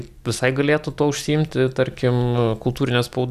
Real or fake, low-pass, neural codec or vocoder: real; 14.4 kHz; none